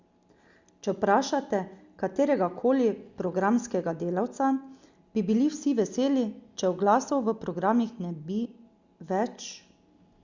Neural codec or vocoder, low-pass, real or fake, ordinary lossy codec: none; 7.2 kHz; real; Opus, 64 kbps